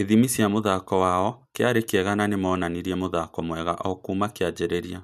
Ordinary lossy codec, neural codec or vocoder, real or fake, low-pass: none; none; real; 14.4 kHz